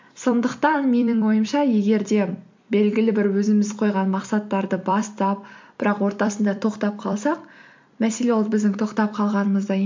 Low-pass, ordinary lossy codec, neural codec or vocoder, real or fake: 7.2 kHz; MP3, 48 kbps; vocoder, 44.1 kHz, 128 mel bands every 256 samples, BigVGAN v2; fake